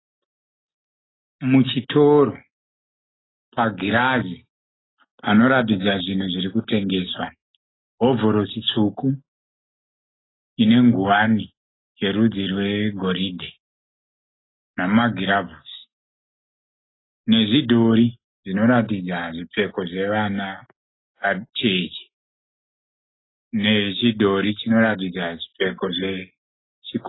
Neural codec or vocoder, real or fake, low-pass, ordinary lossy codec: none; real; 7.2 kHz; AAC, 16 kbps